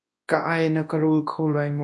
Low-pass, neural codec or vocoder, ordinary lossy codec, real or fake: 10.8 kHz; codec, 24 kHz, 0.9 kbps, WavTokenizer, large speech release; MP3, 48 kbps; fake